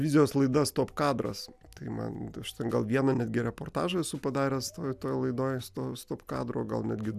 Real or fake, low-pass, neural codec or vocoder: real; 14.4 kHz; none